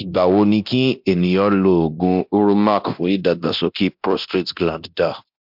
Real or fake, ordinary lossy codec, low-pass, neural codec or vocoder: fake; MP3, 48 kbps; 5.4 kHz; codec, 24 kHz, 0.9 kbps, DualCodec